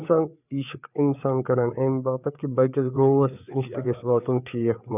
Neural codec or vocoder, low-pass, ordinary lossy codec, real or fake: codec, 16 kHz, 4 kbps, FreqCodec, larger model; 3.6 kHz; none; fake